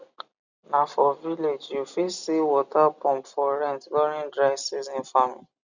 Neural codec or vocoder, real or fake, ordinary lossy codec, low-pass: none; real; Opus, 64 kbps; 7.2 kHz